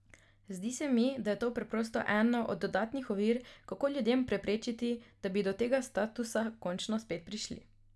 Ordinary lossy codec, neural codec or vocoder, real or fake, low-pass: none; none; real; none